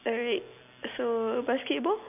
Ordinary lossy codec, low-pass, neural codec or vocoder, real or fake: none; 3.6 kHz; none; real